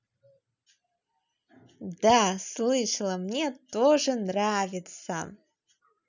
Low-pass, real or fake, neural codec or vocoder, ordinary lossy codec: 7.2 kHz; real; none; none